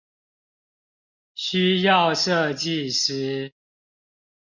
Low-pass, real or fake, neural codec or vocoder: 7.2 kHz; real; none